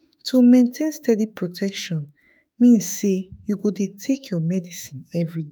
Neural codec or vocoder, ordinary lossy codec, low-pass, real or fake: autoencoder, 48 kHz, 32 numbers a frame, DAC-VAE, trained on Japanese speech; none; none; fake